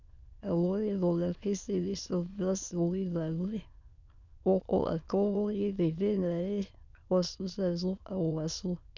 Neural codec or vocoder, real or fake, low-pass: autoencoder, 22.05 kHz, a latent of 192 numbers a frame, VITS, trained on many speakers; fake; 7.2 kHz